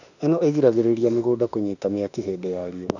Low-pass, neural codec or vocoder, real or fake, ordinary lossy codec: 7.2 kHz; autoencoder, 48 kHz, 32 numbers a frame, DAC-VAE, trained on Japanese speech; fake; none